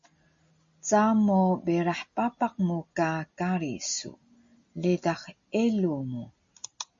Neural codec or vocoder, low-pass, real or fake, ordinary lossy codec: none; 7.2 kHz; real; MP3, 48 kbps